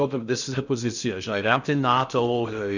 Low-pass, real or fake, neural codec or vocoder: 7.2 kHz; fake; codec, 16 kHz in and 24 kHz out, 0.6 kbps, FocalCodec, streaming, 2048 codes